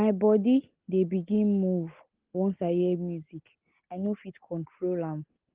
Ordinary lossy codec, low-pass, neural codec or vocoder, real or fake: Opus, 16 kbps; 3.6 kHz; none; real